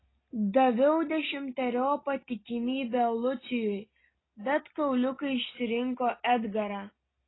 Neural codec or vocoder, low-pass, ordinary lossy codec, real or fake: none; 7.2 kHz; AAC, 16 kbps; real